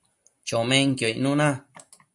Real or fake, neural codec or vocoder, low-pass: real; none; 10.8 kHz